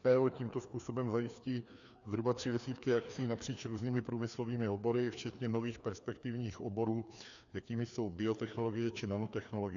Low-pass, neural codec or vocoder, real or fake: 7.2 kHz; codec, 16 kHz, 2 kbps, FreqCodec, larger model; fake